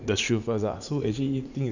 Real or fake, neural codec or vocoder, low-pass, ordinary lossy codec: fake; vocoder, 44.1 kHz, 80 mel bands, Vocos; 7.2 kHz; none